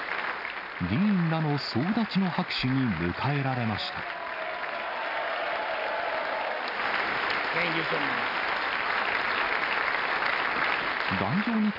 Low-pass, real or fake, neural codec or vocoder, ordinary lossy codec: 5.4 kHz; real; none; none